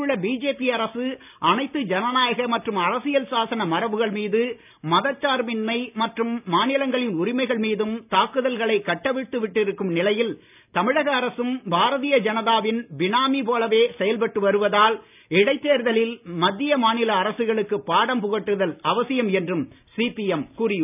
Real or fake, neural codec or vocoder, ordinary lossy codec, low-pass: real; none; none; 3.6 kHz